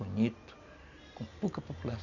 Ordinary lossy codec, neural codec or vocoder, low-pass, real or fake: none; none; 7.2 kHz; real